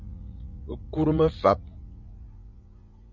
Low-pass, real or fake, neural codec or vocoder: 7.2 kHz; fake; vocoder, 44.1 kHz, 80 mel bands, Vocos